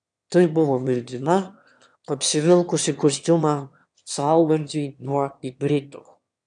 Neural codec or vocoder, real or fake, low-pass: autoencoder, 22.05 kHz, a latent of 192 numbers a frame, VITS, trained on one speaker; fake; 9.9 kHz